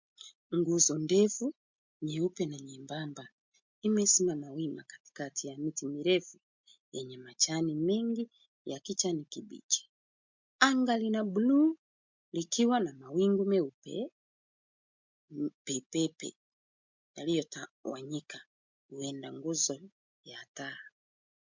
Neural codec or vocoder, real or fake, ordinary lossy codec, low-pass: none; real; MP3, 64 kbps; 7.2 kHz